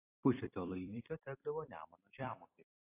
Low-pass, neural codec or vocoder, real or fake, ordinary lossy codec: 3.6 kHz; vocoder, 44.1 kHz, 128 mel bands every 256 samples, BigVGAN v2; fake; AAC, 16 kbps